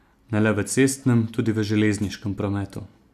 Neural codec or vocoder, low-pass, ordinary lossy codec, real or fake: none; 14.4 kHz; none; real